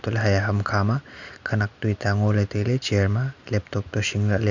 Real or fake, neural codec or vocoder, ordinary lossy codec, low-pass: real; none; none; 7.2 kHz